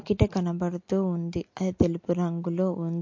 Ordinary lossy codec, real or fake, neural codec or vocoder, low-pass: MP3, 48 kbps; real; none; 7.2 kHz